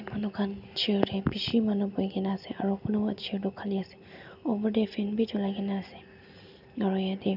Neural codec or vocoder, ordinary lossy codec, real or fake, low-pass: none; none; real; 5.4 kHz